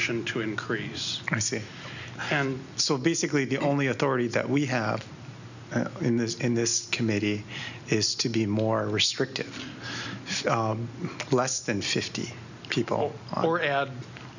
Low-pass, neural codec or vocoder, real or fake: 7.2 kHz; none; real